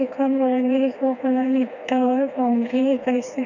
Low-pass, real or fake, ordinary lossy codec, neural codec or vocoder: 7.2 kHz; fake; none; codec, 16 kHz, 2 kbps, FreqCodec, smaller model